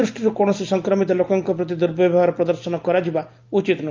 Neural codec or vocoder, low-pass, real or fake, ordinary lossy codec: none; 7.2 kHz; real; Opus, 24 kbps